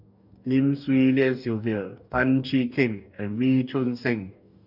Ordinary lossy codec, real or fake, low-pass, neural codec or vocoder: none; fake; 5.4 kHz; codec, 44.1 kHz, 2.6 kbps, DAC